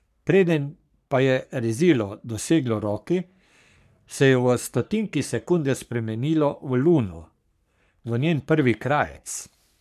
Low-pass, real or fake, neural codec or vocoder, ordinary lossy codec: 14.4 kHz; fake; codec, 44.1 kHz, 3.4 kbps, Pupu-Codec; none